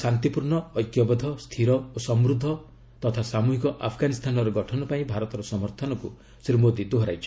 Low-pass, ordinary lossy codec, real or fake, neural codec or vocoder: none; none; real; none